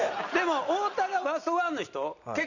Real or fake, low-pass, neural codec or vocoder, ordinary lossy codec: real; 7.2 kHz; none; none